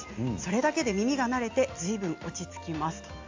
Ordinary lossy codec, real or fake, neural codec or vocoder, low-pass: AAC, 48 kbps; real; none; 7.2 kHz